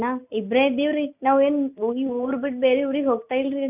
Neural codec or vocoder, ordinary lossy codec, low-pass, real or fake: none; none; 3.6 kHz; real